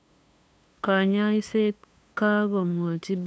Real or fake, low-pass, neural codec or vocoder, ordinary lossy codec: fake; none; codec, 16 kHz, 2 kbps, FunCodec, trained on LibriTTS, 25 frames a second; none